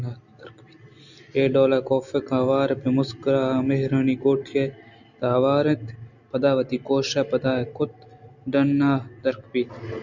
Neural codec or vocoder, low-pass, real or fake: none; 7.2 kHz; real